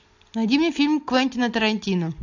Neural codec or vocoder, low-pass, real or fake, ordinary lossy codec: none; 7.2 kHz; real; AAC, 48 kbps